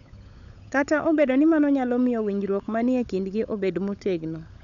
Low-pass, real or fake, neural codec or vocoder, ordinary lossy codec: 7.2 kHz; fake; codec, 16 kHz, 16 kbps, FunCodec, trained on LibriTTS, 50 frames a second; none